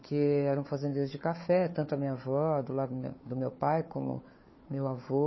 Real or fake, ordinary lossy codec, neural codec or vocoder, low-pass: fake; MP3, 24 kbps; codec, 16 kHz, 4 kbps, FunCodec, trained on Chinese and English, 50 frames a second; 7.2 kHz